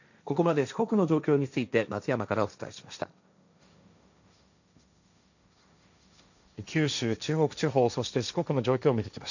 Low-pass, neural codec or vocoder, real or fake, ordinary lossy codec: 7.2 kHz; codec, 16 kHz, 1.1 kbps, Voila-Tokenizer; fake; none